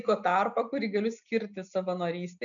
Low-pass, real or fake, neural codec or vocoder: 7.2 kHz; real; none